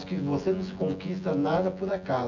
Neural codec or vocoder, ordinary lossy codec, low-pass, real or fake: vocoder, 24 kHz, 100 mel bands, Vocos; none; 7.2 kHz; fake